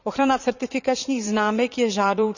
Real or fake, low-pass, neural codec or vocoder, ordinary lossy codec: fake; 7.2 kHz; vocoder, 44.1 kHz, 80 mel bands, Vocos; none